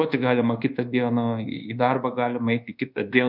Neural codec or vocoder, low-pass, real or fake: codec, 24 kHz, 1.2 kbps, DualCodec; 5.4 kHz; fake